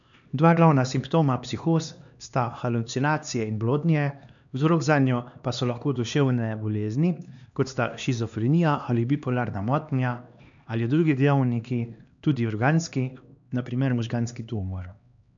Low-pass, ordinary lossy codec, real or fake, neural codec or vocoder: 7.2 kHz; MP3, 96 kbps; fake; codec, 16 kHz, 2 kbps, X-Codec, HuBERT features, trained on LibriSpeech